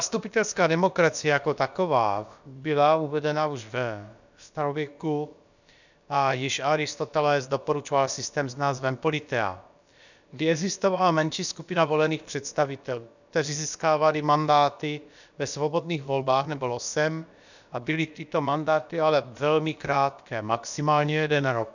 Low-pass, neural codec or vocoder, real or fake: 7.2 kHz; codec, 16 kHz, about 1 kbps, DyCAST, with the encoder's durations; fake